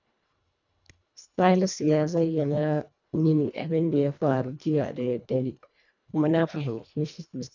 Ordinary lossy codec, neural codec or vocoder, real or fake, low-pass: none; codec, 24 kHz, 1.5 kbps, HILCodec; fake; 7.2 kHz